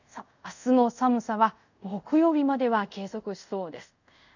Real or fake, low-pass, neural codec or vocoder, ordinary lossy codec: fake; 7.2 kHz; codec, 24 kHz, 0.5 kbps, DualCodec; none